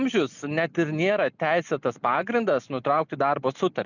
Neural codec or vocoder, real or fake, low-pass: none; real; 7.2 kHz